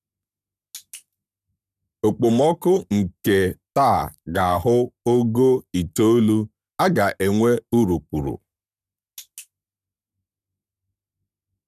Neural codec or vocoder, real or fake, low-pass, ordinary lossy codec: codec, 44.1 kHz, 7.8 kbps, Pupu-Codec; fake; 14.4 kHz; none